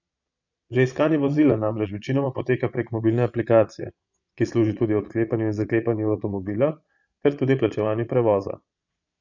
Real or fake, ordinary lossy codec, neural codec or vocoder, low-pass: fake; none; vocoder, 22.05 kHz, 80 mel bands, Vocos; 7.2 kHz